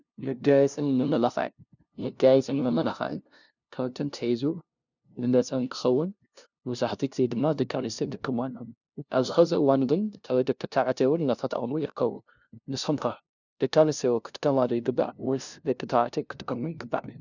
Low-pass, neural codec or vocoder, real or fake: 7.2 kHz; codec, 16 kHz, 0.5 kbps, FunCodec, trained on LibriTTS, 25 frames a second; fake